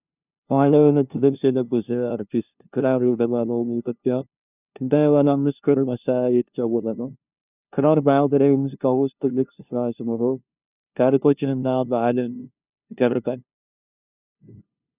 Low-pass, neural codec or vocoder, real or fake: 3.6 kHz; codec, 16 kHz, 0.5 kbps, FunCodec, trained on LibriTTS, 25 frames a second; fake